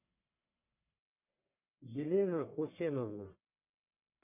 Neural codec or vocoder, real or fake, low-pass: codec, 44.1 kHz, 1.7 kbps, Pupu-Codec; fake; 3.6 kHz